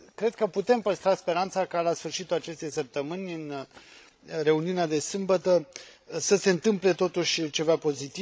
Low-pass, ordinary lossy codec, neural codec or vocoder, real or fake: none; none; codec, 16 kHz, 16 kbps, FreqCodec, larger model; fake